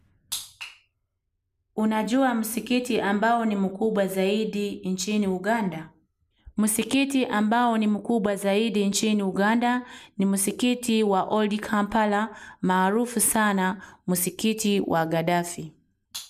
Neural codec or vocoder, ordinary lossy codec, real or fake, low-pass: none; none; real; 14.4 kHz